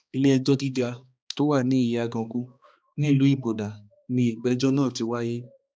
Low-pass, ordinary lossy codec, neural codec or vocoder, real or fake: none; none; codec, 16 kHz, 2 kbps, X-Codec, HuBERT features, trained on balanced general audio; fake